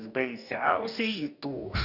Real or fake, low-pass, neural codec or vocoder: fake; 5.4 kHz; codec, 44.1 kHz, 2.6 kbps, DAC